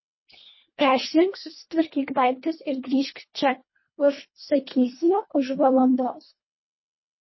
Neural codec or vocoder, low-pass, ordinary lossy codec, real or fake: codec, 24 kHz, 1.5 kbps, HILCodec; 7.2 kHz; MP3, 24 kbps; fake